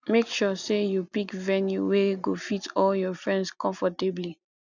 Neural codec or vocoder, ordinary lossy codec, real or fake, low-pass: none; none; real; 7.2 kHz